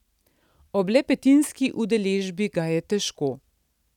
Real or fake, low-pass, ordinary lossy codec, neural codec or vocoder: real; 19.8 kHz; none; none